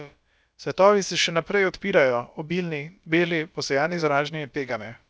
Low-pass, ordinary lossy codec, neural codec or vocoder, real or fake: none; none; codec, 16 kHz, about 1 kbps, DyCAST, with the encoder's durations; fake